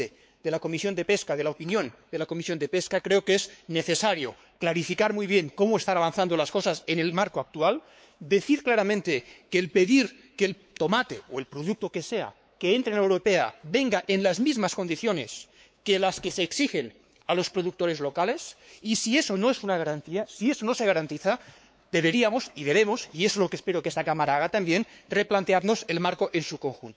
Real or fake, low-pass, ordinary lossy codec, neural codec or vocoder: fake; none; none; codec, 16 kHz, 4 kbps, X-Codec, WavLM features, trained on Multilingual LibriSpeech